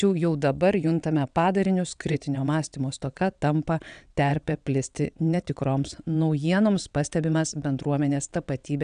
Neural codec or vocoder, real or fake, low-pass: vocoder, 22.05 kHz, 80 mel bands, WaveNeXt; fake; 9.9 kHz